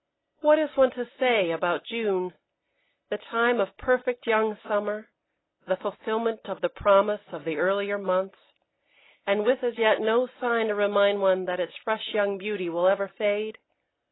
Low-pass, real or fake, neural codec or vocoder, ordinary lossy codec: 7.2 kHz; real; none; AAC, 16 kbps